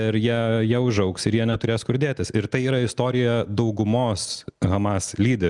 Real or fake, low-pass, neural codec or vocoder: real; 10.8 kHz; none